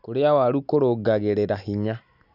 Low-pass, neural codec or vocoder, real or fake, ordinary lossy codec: 5.4 kHz; none; real; none